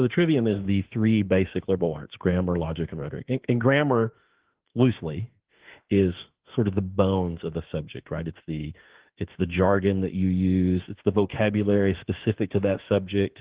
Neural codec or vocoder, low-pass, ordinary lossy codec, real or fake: autoencoder, 48 kHz, 32 numbers a frame, DAC-VAE, trained on Japanese speech; 3.6 kHz; Opus, 16 kbps; fake